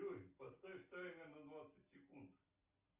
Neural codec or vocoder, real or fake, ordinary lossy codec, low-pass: none; real; Opus, 32 kbps; 3.6 kHz